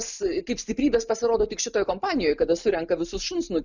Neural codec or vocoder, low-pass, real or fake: none; 7.2 kHz; real